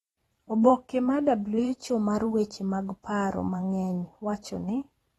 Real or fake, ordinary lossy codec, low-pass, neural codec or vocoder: fake; AAC, 32 kbps; 19.8 kHz; vocoder, 44.1 kHz, 128 mel bands every 512 samples, BigVGAN v2